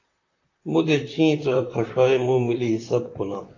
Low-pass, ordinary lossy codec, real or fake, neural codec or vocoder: 7.2 kHz; AAC, 32 kbps; fake; vocoder, 44.1 kHz, 128 mel bands, Pupu-Vocoder